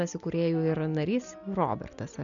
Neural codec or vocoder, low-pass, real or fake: none; 7.2 kHz; real